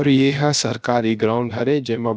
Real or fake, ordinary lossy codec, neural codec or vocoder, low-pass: fake; none; codec, 16 kHz, 0.7 kbps, FocalCodec; none